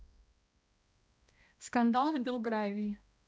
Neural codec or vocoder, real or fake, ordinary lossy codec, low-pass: codec, 16 kHz, 1 kbps, X-Codec, HuBERT features, trained on balanced general audio; fake; none; none